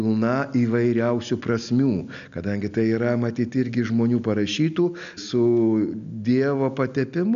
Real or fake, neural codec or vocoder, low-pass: real; none; 7.2 kHz